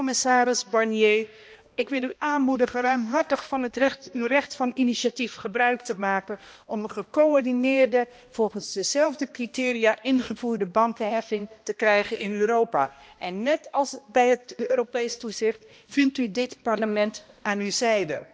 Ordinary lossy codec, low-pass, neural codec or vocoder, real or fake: none; none; codec, 16 kHz, 1 kbps, X-Codec, HuBERT features, trained on balanced general audio; fake